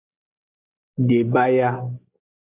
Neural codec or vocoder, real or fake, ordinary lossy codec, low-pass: none; real; AAC, 32 kbps; 3.6 kHz